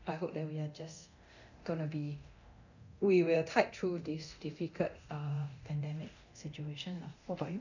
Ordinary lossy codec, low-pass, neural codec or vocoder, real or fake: none; 7.2 kHz; codec, 24 kHz, 0.9 kbps, DualCodec; fake